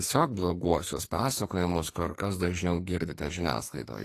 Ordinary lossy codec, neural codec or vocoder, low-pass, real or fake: AAC, 48 kbps; codec, 44.1 kHz, 2.6 kbps, SNAC; 14.4 kHz; fake